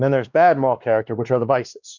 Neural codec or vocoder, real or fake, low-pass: codec, 16 kHz, 2 kbps, X-Codec, WavLM features, trained on Multilingual LibriSpeech; fake; 7.2 kHz